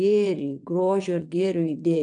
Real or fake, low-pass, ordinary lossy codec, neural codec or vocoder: fake; 9.9 kHz; MP3, 96 kbps; vocoder, 22.05 kHz, 80 mel bands, WaveNeXt